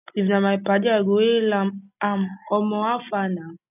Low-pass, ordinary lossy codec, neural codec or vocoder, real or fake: 3.6 kHz; none; none; real